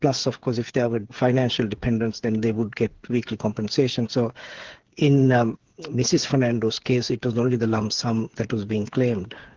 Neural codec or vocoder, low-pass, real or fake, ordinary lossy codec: codec, 16 kHz, 8 kbps, FreqCodec, smaller model; 7.2 kHz; fake; Opus, 16 kbps